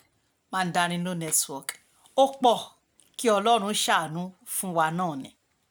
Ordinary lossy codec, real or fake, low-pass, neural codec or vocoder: none; real; none; none